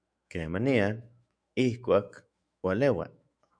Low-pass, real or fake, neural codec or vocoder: 9.9 kHz; fake; autoencoder, 48 kHz, 128 numbers a frame, DAC-VAE, trained on Japanese speech